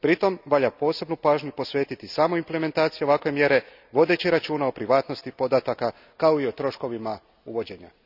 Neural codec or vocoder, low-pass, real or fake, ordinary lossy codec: none; 5.4 kHz; real; none